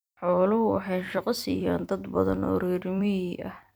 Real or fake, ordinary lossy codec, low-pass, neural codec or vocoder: fake; none; none; vocoder, 44.1 kHz, 128 mel bands every 256 samples, BigVGAN v2